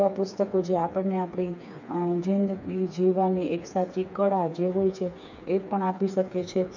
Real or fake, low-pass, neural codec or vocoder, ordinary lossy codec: fake; 7.2 kHz; codec, 16 kHz, 4 kbps, FreqCodec, smaller model; none